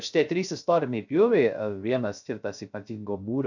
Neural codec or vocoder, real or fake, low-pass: codec, 16 kHz, 0.3 kbps, FocalCodec; fake; 7.2 kHz